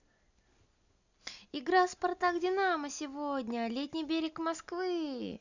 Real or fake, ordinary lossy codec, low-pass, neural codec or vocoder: real; none; 7.2 kHz; none